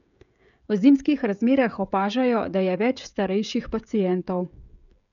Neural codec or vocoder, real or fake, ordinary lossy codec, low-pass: codec, 16 kHz, 16 kbps, FreqCodec, smaller model; fake; none; 7.2 kHz